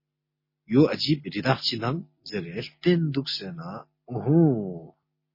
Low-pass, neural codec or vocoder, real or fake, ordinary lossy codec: 5.4 kHz; none; real; MP3, 24 kbps